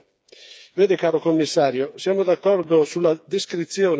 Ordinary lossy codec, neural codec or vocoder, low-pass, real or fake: none; codec, 16 kHz, 4 kbps, FreqCodec, smaller model; none; fake